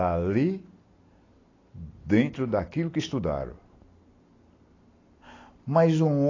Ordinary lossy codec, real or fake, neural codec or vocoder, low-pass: AAC, 32 kbps; real; none; 7.2 kHz